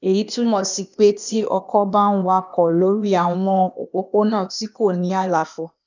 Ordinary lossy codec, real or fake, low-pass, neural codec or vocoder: none; fake; 7.2 kHz; codec, 16 kHz, 0.8 kbps, ZipCodec